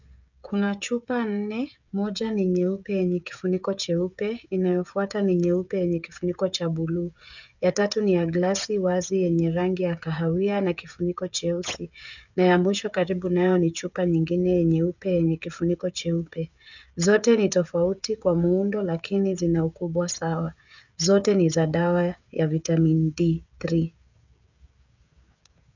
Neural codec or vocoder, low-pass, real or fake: codec, 16 kHz, 16 kbps, FreqCodec, smaller model; 7.2 kHz; fake